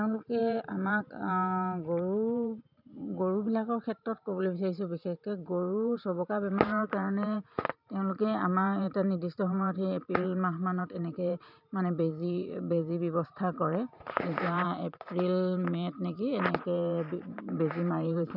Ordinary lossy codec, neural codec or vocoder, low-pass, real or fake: none; vocoder, 44.1 kHz, 128 mel bands every 512 samples, BigVGAN v2; 5.4 kHz; fake